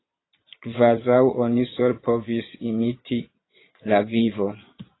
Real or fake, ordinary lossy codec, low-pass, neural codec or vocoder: fake; AAC, 16 kbps; 7.2 kHz; vocoder, 22.05 kHz, 80 mel bands, Vocos